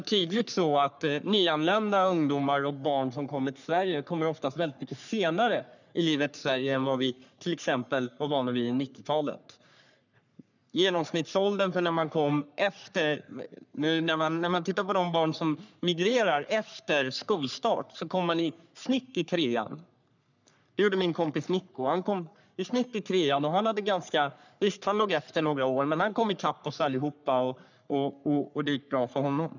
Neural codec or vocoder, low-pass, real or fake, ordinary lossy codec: codec, 44.1 kHz, 3.4 kbps, Pupu-Codec; 7.2 kHz; fake; none